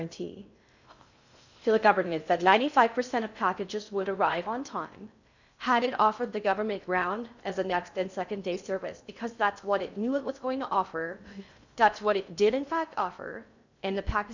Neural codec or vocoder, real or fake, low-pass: codec, 16 kHz in and 24 kHz out, 0.6 kbps, FocalCodec, streaming, 2048 codes; fake; 7.2 kHz